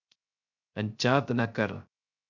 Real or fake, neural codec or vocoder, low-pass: fake; codec, 16 kHz, 0.3 kbps, FocalCodec; 7.2 kHz